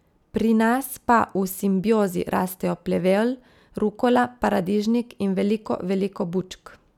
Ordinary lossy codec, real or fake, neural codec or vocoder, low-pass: none; real; none; 19.8 kHz